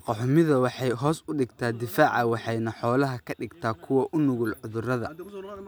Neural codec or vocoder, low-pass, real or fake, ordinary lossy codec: none; none; real; none